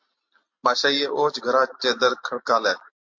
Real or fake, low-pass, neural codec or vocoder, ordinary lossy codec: real; 7.2 kHz; none; MP3, 48 kbps